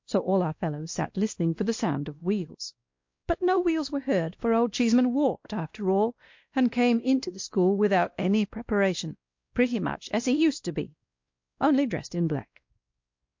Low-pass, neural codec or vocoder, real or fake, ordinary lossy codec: 7.2 kHz; codec, 16 kHz, 1 kbps, X-Codec, WavLM features, trained on Multilingual LibriSpeech; fake; MP3, 48 kbps